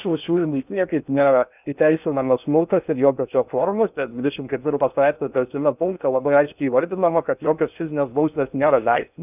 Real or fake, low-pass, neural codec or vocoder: fake; 3.6 kHz; codec, 16 kHz in and 24 kHz out, 0.6 kbps, FocalCodec, streaming, 2048 codes